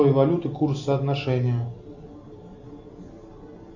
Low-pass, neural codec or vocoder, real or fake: 7.2 kHz; none; real